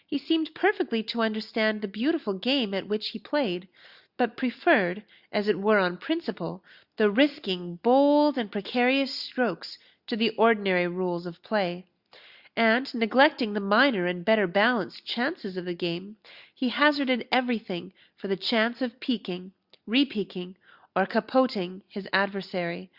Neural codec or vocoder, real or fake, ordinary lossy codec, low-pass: none; real; Opus, 64 kbps; 5.4 kHz